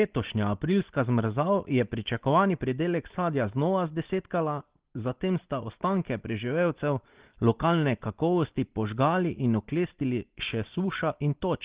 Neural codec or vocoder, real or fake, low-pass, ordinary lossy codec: none; real; 3.6 kHz; Opus, 16 kbps